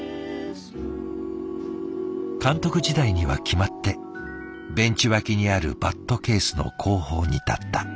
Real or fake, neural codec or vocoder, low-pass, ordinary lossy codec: real; none; none; none